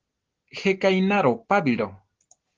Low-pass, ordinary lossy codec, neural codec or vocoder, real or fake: 7.2 kHz; Opus, 16 kbps; none; real